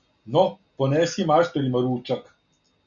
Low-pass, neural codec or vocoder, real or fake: 7.2 kHz; none; real